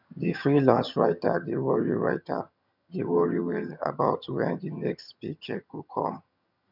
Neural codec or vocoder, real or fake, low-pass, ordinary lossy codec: vocoder, 22.05 kHz, 80 mel bands, HiFi-GAN; fake; 5.4 kHz; none